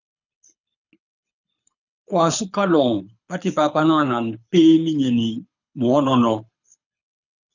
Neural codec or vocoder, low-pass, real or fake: codec, 24 kHz, 6 kbps, HILCodec; 7.2 kHz; fake